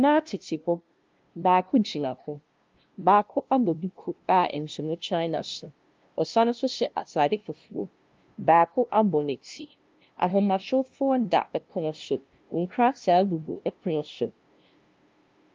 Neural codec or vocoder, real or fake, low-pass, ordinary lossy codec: codec, 16 kHz, 0.5 kbps, FunCodec, trained on LibriTTS, 25 frames a second; fake; 7.2 kHz; Opus, 24 kbps